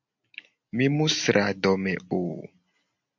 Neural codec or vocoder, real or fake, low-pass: none; real; 7.2 kHz